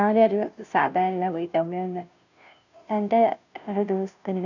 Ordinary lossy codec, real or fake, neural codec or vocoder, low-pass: none; fake; codec, 16 kHz, 0.5 kbps, FunCodec, trained on Chinese and English, 25 frames a second; 7.2 kHz